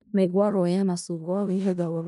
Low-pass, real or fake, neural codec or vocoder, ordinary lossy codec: 10.8 kHz; fake; codec, 16 kHz in and 24 kHz out, 0.4 kbps, LongCat-Audio-Codec, four codebook decoder; none